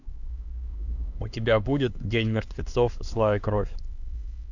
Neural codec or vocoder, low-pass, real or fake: codec, 16 kHz, 2 kbps, X-Codec, HuBERT features, trained on LibriSpeech; 7.2 kHz; fake